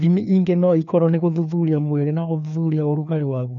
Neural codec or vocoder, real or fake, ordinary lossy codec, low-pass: codec, 16 kHz, 2 kbps, FreqCodec, larger model; fake; none; 7.2 kHz